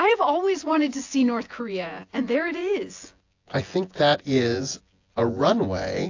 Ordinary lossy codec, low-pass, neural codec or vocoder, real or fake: AAC, 48 kbps; 7.2 kHz; vocoder, 24 kHz, 100 mel bands, Vocos; fake